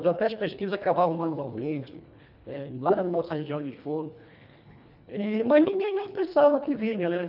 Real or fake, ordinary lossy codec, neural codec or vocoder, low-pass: fake; none; codec, 24 kHz, 1.5 kbps, HILCodec; 5.4 kHz